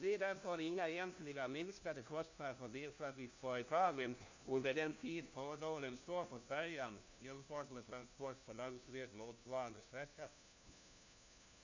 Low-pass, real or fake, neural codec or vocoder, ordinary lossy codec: 7.2 kHz; fake; codec, 16 kHz, 1 kbps, FunCodec, trained on LibriTTS, 50 frames a second; AAC, 48 kbps